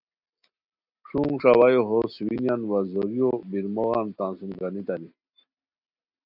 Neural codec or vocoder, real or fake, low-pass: none; real; 5.4 kHz